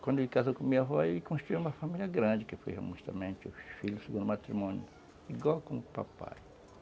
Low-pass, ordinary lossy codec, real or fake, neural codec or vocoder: none; none; real; none